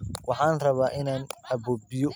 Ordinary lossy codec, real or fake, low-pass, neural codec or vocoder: none; real; none; none